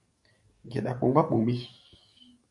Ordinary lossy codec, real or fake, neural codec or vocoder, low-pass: MP3, 48 kbps; fake; codec, 44.1 kHz, 7.8 kbps, DAC; 10.8 kHz